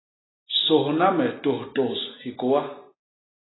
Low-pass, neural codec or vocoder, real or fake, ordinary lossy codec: 7.2 kHz; none; real; AAC, 16 kbps